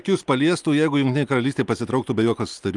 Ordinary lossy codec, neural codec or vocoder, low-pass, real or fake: Opus, 32 kbps; none; 10.8 kHz; real